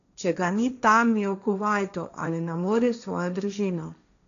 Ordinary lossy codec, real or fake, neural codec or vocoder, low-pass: none; fake; codec, 16 kHz, 1.1 kbps, Voila-Tokenizer; 7.2 kHz